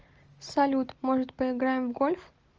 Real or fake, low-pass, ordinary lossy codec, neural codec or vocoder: real; 7.2 kHz; Opus, 24 kbps; none